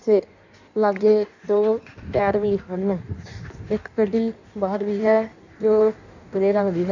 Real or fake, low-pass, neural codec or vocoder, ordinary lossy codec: fake; 7.2 kHz; codec, 16 kHz in and 24 kHz out, 1.1 kbps, FireRedTTS-2 codec; none